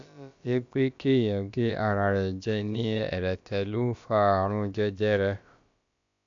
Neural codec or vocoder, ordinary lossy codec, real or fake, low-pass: codec, 16 kHz, about 1 kbps, DyCAST, with the encoder's durations; AAC, 64 kbps; fake; 7.2 kHz